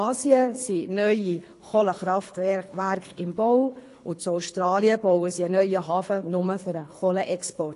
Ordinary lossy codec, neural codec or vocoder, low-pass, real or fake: AAC, 48 kbps; codec, 24 kHz, 3 kbps, HILCodec; 10.8 kHz; fake